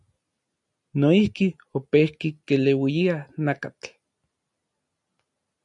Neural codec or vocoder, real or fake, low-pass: none; real; 10.8 kHz